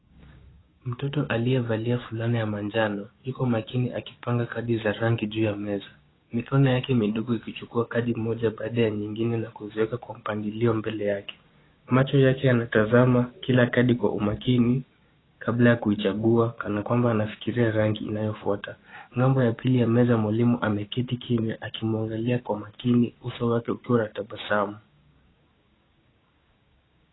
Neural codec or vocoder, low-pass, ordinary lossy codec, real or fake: codec, 16 kHz, 6 kbps, DAC; 7.2 kHz; AAC, 16 kbps; fake